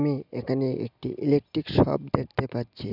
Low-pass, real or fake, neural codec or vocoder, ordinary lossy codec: 5.4 kHz; real; none; none